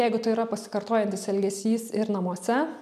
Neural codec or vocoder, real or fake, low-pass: none; real; 14.4 kHz